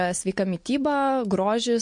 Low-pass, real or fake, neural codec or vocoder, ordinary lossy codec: 10.8 kHz; real; none; MP3, 48 kbps